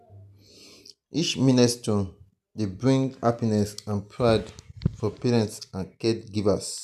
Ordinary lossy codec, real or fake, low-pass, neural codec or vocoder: none; real; 14.4 kHz; none